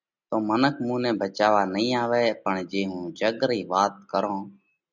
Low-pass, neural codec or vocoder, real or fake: 7.2 kHz; none; real